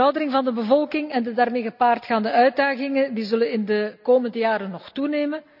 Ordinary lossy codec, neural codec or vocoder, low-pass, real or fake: none; none; 5.4 kHz; real